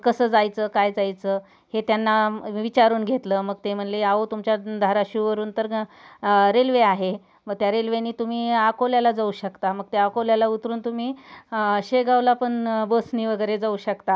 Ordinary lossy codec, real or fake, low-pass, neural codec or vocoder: none; real; none; none